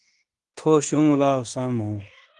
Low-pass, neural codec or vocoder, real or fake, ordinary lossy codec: 10.8 kHz; codec, 16 kHz in and 24 kHz out, 0.9 kbps, LongCat-Audio-Codec, fine tuned four codebook decoder; fake; Opus, 24 kbps